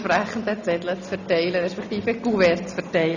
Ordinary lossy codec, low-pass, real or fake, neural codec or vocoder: none; 7.2 kHz; real; none